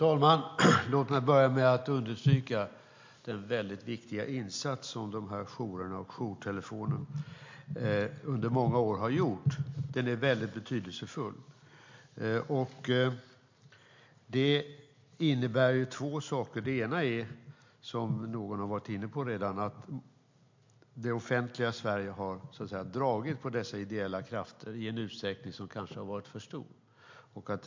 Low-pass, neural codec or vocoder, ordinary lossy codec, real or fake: 7.2 kHz; none; MP3, 48 kbps; real